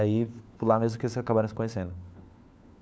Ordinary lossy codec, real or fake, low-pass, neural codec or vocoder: none; fake; none; codec, 16 kHz, 4 kbps, FunCodec, trained on LibriTTS, 50 frames a second